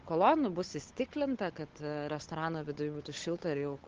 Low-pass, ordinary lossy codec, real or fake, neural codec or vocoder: 7.2 kHz; Opus, 16 kbps; fake; codec, 16 kHz, 8 kbps, FunCodec, trained on Chinese and English, 25 frames a second